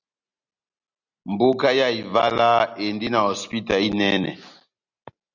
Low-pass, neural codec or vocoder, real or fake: 7.2 kHz; none; real